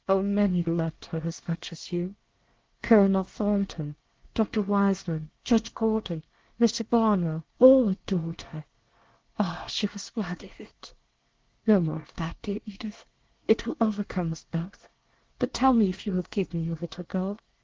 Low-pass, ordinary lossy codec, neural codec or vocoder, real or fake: 7.2 kHz; Opus, 16 kbps; codec, 24 kHz, 1 kbps, SNAC; fake